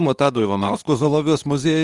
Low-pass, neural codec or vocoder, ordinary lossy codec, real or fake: 10.8 kHz; codec, 24 kHz, 0.9 kbps, WavTokenizer, medium speech release version 2; Opus, 32 kbps; fake